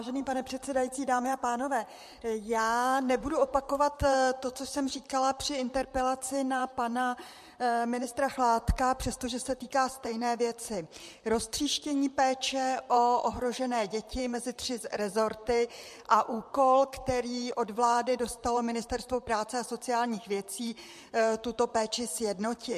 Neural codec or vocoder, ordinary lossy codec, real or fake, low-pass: vocoder, 44.1 kHz, 128 mel bands, Pupu-Vocoder; MP3, 64 kbps; fake; 14.4 kHz